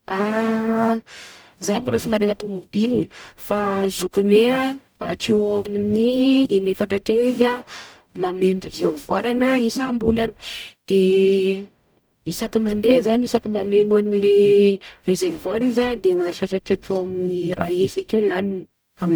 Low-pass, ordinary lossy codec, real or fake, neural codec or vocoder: none; none; fake; codec, 44.1 kHz, 0.9 kbps, DAC